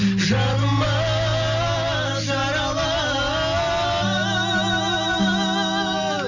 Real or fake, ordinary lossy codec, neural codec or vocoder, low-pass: real; none; none; 7.2 kHz